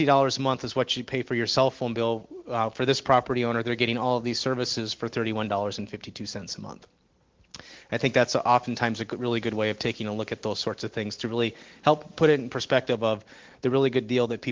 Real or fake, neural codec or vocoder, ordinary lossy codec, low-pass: real; none; Opus, 16 kbps; 7.2 kHz